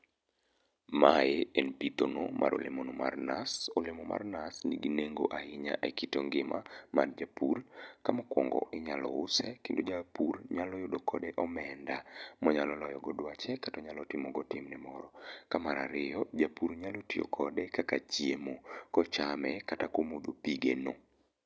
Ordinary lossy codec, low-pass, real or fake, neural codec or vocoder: none; none; real; none